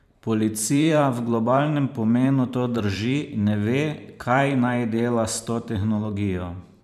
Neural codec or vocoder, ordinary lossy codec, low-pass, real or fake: vocoder, 44.1 kHz, 128 mel bands every 512 samples, BigVGAN v2; none; 14.4 kHz; fake